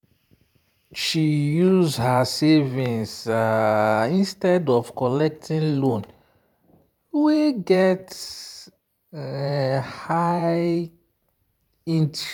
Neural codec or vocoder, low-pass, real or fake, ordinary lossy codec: vocoder, 44.1 kHz, 128 mel bands every 512 samples, BigVGAN v2; 19.8 kHz; fake; none